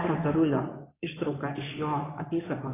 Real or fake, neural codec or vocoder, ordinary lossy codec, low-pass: fake; codec, 16 kHz in and 24 kHz out, 2.2 kbps, FireRedTTS-2 codec; AAC, 16 kbps; 3.6 kHz